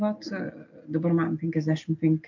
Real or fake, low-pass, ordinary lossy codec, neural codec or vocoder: real; 7.2 kHz; MP3, 48 kbps; none